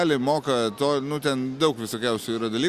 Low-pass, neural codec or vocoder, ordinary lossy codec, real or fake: 14.4 kHz; none; Opus, 64 kbps; real